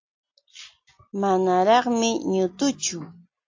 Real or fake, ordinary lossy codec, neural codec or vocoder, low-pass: real; AAC, 48 kbps; none; 7.2 kHz